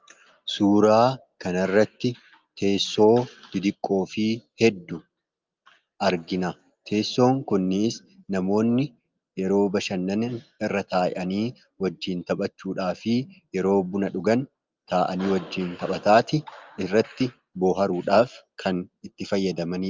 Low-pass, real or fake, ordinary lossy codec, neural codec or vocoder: 7.2 kHz; real; Opus, 32 kbps; none